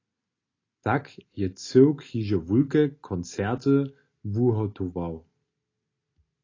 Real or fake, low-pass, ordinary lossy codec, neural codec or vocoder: real; 7.2 kHz; AAC, 32 kbps; none